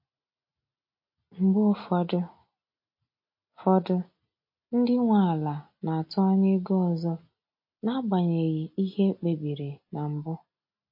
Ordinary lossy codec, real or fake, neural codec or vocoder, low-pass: MP3, 32 kbps; real; none; 5.4 kHz